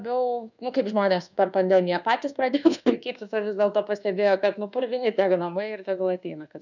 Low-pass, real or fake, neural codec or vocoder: 7.2 kHz; fake; codec, 24 kHz, 1.2 kbps, DualCodec